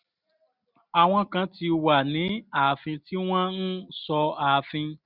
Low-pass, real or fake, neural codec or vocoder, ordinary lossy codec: 5.4 kHz; real; none; none